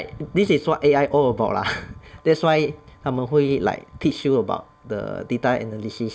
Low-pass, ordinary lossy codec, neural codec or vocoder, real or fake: none; none; none; real